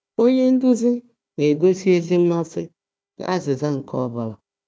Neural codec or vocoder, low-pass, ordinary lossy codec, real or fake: codec, 16 kHz, 1 kbps, FunCodec, trained on Chinese and English, 50 frames a second; none; none; fake